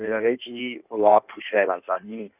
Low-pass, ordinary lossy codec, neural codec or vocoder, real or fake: 3.6 kHz; none; codec, 16 kHz in and 24 kHz out, 1.1 kbps, FireRedTTS-2 codec; fake